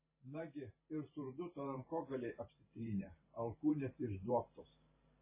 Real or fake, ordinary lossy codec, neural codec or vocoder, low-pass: fake; MP3, 16 kbps; vocoder, 44.1 kHz, 128 mel bands every 512 samples, BigVGAN v2; 3.6 kHz